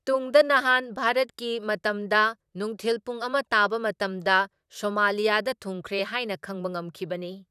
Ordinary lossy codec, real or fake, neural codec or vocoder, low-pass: none; fake; vocoder, 44.1 kHz, 128 mel bands, Pupu-Vocoder; 14.4 kHz